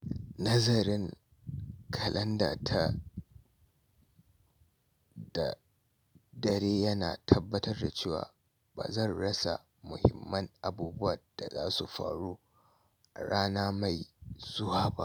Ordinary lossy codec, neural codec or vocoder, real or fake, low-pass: none; none; real; none